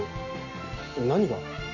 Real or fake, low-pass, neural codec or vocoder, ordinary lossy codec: real; 7.2 kHz; none; none